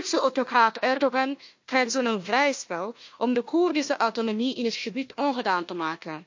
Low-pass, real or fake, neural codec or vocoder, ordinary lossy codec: 7.2 kHz; fake; codec, 16 kHz, 1 kbps, FunCodec, trained on Chinese and English, 50 frames a second; MP3, 48 kbps